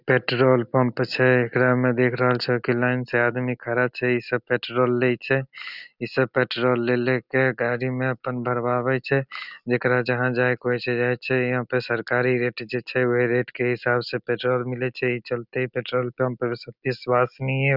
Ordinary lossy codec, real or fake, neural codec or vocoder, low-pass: none; real; none; 5.4 kHz